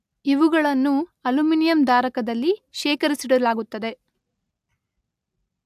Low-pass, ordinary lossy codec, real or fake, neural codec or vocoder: 14.4 kHz; AAC, 96 kbps; real; none